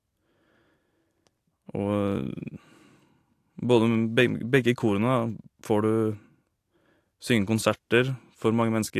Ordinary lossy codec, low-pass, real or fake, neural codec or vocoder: AAC, 64 kbps; 14.4 kHz; real; none